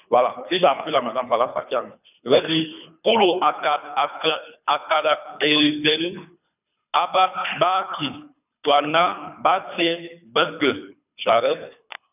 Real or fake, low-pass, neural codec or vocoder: fake; 3.6 kHz; codec, 24 kHz, 3 kbps, HILCodec